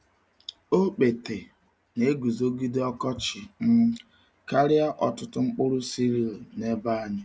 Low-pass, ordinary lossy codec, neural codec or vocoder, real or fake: none; none; none; real